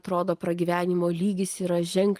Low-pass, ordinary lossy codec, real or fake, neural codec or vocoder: 14.4 kHz; Opus, 24 kbps; real; none